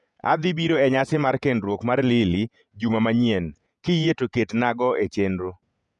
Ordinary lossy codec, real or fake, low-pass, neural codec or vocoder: none; fake; 10.8 kHz; vocoder, 48 kHz, 128 mel bands, Vocos